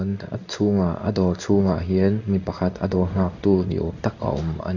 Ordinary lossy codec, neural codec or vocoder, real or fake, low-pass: none; codec, 16 kHz in and 24 kHz out, 1 kbps, XY-Tokenizer; fake; 7.2 kHz